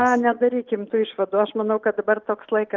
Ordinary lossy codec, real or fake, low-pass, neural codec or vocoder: Opus, 32 kbps; real; 7.2 kHz; none